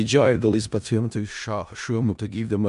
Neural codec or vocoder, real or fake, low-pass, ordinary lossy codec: codec, 16 kHz in and 24 kHz out, 0.4 kbps, LongCat-Audio-Codec, four codebook decoder; fake; 10.8 kHz; AAC, 96 kbps